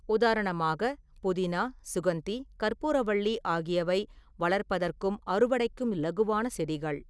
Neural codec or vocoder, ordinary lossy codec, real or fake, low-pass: none; none; real; none